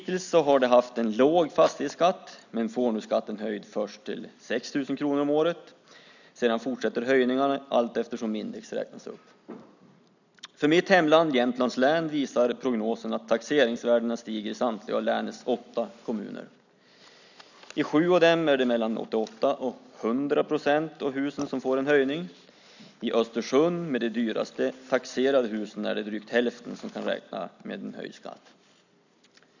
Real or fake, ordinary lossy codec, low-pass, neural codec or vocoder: real; none; 7.2 kHz; none